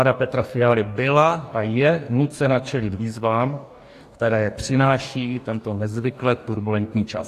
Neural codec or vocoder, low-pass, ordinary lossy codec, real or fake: codec, 44.1 kHz, 2.6 kbps, DAC; 14.4 kHz; AAC, 64 kbps; fake